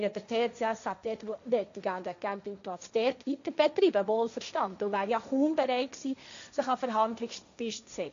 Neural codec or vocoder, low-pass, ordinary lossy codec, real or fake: codec, 16 kHz, 1.1 kbps, Voila-Tokenizer; 7.2 kHz; MP3, 64 kbps; fake